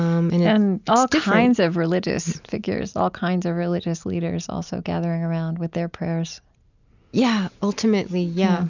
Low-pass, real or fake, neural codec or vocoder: 7.2 kHz; real; none